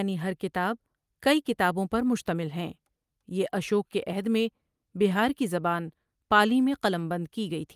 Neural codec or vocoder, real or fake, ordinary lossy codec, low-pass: none; real; Opus, 32 kbps; 14.4 kHz